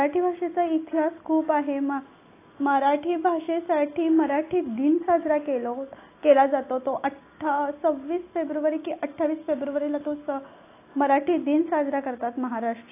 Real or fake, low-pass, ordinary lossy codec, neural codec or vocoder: real; 3.6 kHz; AAC, 24 kbps; none